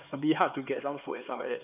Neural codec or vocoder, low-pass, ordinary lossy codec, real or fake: codec, 16 kHz, 4 kbps, X-Codec, HuBERT features, trained on LibriSpeech; 3.6 kHz; none; fake